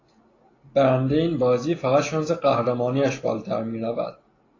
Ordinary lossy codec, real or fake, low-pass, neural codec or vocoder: AAC, 32 kbps; real; 7.2 kHz; none